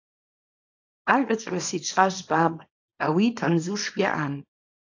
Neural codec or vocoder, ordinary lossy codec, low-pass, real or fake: codec, 24 kHz, 0.9 kbps, WavTokenizer, small release; AAC, 48 kbps; 7.2 kHz; fake